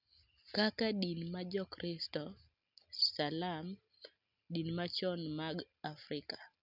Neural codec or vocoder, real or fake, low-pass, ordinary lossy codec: none; real; 5.4 kHz; none